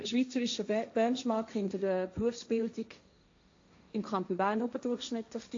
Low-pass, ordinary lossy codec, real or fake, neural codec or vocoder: 7.2 kHz; AAC, 48 kbps; fake; codec, 16 kHz, 1.1 kbps, Voila-Tokenizer